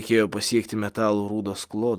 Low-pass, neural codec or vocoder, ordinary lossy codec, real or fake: 14.4 kHz; none; Opus, 32 kbps; real